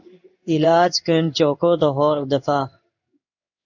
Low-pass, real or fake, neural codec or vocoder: 7.2 kHz; fake; codec, 16 kHz in and 24 kHz out, 1 kbps, XY-Tokenizer